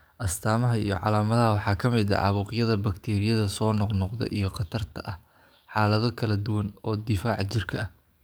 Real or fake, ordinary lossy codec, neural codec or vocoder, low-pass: fake; none; codec, 44.1 kHz, 7.8 kbps, DAC; none